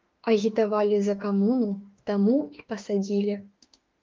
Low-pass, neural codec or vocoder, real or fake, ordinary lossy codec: 7.2 kHz; autoencoder, 48 kHz, 32 numbers a frame, DAC-VAE, trained on Japanese speech; fake; Opus, 32 kbps